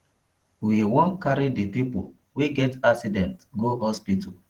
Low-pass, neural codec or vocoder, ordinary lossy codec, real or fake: 19.8 kHz; vocoder, 44.1 kHz, 128 mel bands every 512 samples, BigVGAN v2; Opus, 16 kbps; fake